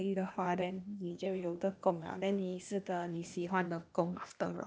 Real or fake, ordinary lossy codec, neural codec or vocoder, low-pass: fake; none; codec, 16 kHz, 0.8 kbps, ZipCodec; none